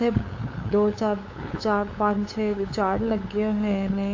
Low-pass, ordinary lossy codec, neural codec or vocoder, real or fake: 7.2 kHz; AAC, 48 kbps; codec, 16 kHz, 8 kbps, FunCodec, trained on LibriTTS, 25 frames a second; fake